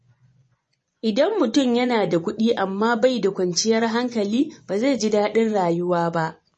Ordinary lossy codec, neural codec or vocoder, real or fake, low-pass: MP3, 32 kbps; vocoder, 24 kHz, 100 mel bands, Vocos; fake; 10.8 kHz